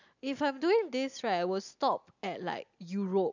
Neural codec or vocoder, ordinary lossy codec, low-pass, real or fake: none; none; 7.2 kHz; real